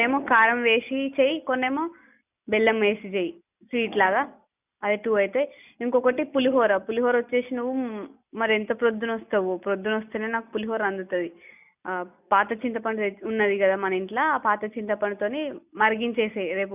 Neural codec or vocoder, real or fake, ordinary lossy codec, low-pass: none; real; none; 3.6 kHz